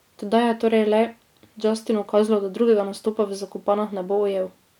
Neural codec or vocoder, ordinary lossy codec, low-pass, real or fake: none; none; 19.8 kHz; real